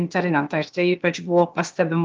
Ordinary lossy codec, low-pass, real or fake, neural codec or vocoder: Opus, 24 kbps; 7.2 kHz; fake; codec, 16 kHz, 0.8 kbps, ZipCodec